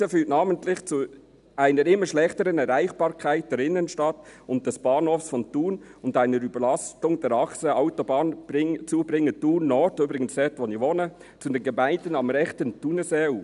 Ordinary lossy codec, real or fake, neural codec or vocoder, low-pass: none; real; none; 10.8 kHz